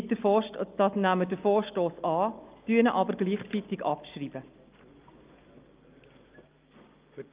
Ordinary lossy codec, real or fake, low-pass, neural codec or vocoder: Opus, 24 kbps; real; 3.6 kHz; none